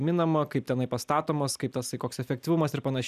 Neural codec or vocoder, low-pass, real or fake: none; 14.4 kHz; real